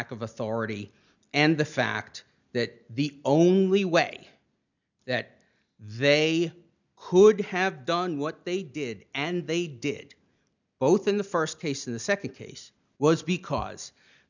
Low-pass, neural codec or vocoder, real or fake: 7.2 kHz; none; real